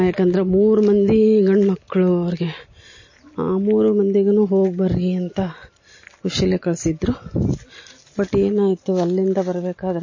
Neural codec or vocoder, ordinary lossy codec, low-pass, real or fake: none; MP3, 32 kbps; 7.2 kHz; real